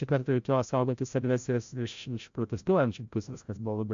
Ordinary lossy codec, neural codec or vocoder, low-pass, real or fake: AAC, 64 kbps; codec, 16 kHz, 0.5 kbps, FreqCodec, larger model; 7.2 kHz; fake